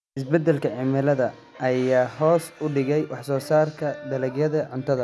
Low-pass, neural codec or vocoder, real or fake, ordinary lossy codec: none; none; real; none